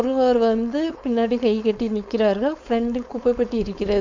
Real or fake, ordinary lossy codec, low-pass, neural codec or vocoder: fake; MP3, 64 kbps; 7.2 kHz; codec, 16 kHz, 4.8 kbps, FACodec